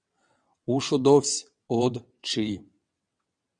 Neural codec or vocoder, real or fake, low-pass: vocoder, 22.05 kHz, 80 mel bands, WaveNeXt; fake; 9.9 kHz